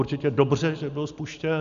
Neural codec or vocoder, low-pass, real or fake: none; 7.2 kHz; real